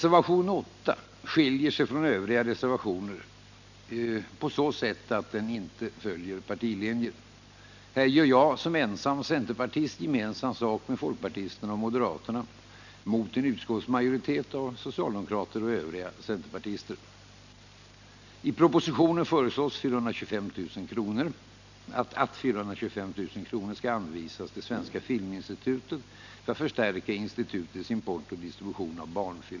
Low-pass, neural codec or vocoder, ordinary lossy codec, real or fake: 7.2 kHz; none; none; real